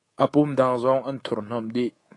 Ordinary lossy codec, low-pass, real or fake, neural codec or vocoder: AAC, 32 kbps; 10.8 kHz; fake; codec, 24 kHz, 3.1 kbps, DualCodec